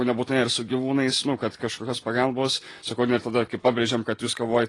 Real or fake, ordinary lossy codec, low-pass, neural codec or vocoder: real; AAC, 32 kbps; 10.8 kHz; none